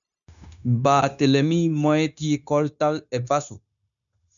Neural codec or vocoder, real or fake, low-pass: codec, 16 kHz, 0.9 kbps, LongCat-Audio-Codec; fake; 7.2 kHz